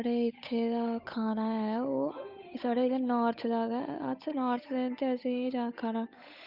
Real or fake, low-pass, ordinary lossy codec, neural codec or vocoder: fake; 5.4 kHz; none; codec, 16 kHz, 8 kbps, FunCodec, trained on Chinese and English, 25 frames a second